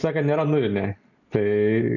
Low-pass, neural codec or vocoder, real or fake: 7.2 kHz; none; real